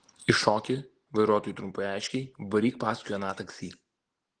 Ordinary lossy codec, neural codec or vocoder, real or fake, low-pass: Opus, 16 kbps; none; real; 9.9 kHz